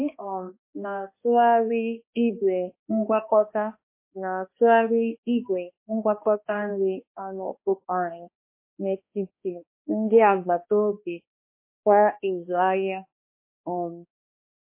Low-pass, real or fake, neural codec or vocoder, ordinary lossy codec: 3.6 kHz; fake; codec, 16 kHz, 1 kbps, X-Codec, HuBERT features, trained on balanced general audio; MP3, 24 kbps